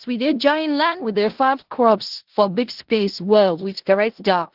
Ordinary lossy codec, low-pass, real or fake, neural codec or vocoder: Opus, 16 kbps; 5.4 kHz; fake; codec, 16 kHz in and 24 kHz out, 0.4 kbps, LongCat-Audio-Codec, four codebook decoder